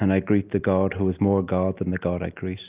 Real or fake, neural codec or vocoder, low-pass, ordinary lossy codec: real; none; 3.6 kHz; Opus, 24 kbps